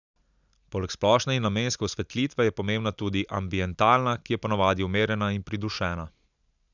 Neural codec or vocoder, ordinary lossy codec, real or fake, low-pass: none; none; real; 7.2 kHz